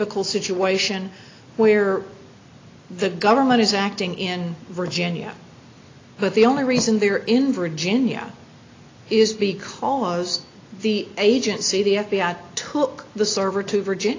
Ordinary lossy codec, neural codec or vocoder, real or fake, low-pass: AAC, 32 kbps; none; real; 7.2 kHz